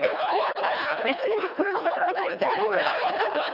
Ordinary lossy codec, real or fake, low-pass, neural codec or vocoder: none; fake; 5.4 kHz; codec, 24 kHz, 1.5 kbps, HILCodec